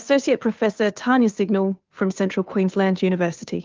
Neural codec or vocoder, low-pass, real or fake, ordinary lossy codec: codec, 16 kHz, 2 kbps, FunCodec, trained on Chinese and English, 25 frames a second; 7.2 kHz; fake; Opus, 24 kbps